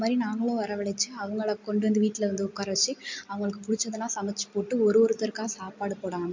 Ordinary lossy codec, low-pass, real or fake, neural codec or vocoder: MP3, 64 kbps; 7.2 kHz; real; none